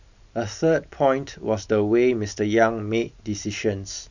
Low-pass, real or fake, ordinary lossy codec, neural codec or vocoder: 7.2 kHz; real; none; none